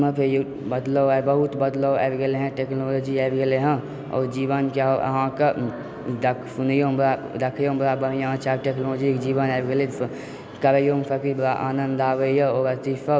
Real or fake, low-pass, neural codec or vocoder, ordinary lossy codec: real; none; none; none